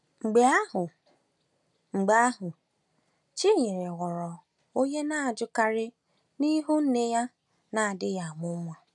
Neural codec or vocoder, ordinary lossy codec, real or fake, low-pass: none; none; real; 10.8 kHz